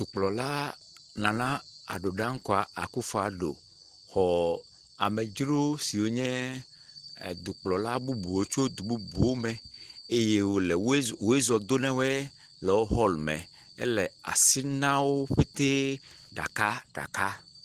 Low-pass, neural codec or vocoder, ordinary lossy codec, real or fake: 14.4 kHz; none; Opus, 16 kbps; real